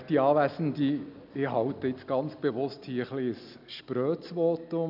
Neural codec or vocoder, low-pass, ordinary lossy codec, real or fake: none; 5.4 kHz; none; real